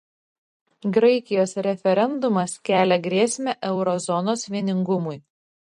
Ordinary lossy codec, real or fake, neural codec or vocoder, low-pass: MP3, 48 kbps; fake; vocoder, 44.1 kHz, 128 mel bands every 256 samples, BigVGAN v2; 14.4 kHz